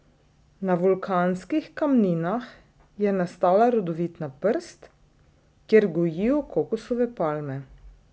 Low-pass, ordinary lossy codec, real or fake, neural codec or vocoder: none; none; real; none